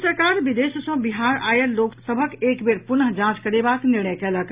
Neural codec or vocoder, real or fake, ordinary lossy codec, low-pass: none; real; Opus, 64 kbps; 3.6 kHz